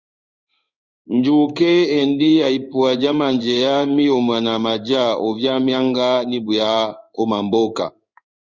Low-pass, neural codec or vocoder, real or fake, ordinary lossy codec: 7.2 kHz; codec, 16 kHz in and 24 kHz out, 1 kbps, XY-Tokenizer; fake; Opus, 64 kbps